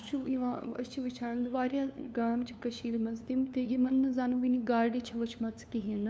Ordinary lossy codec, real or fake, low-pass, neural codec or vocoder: none; fake; none; codec, 16 kHz, 2 kbps, FunCodec, trained on LibriTTS, 25 frames a second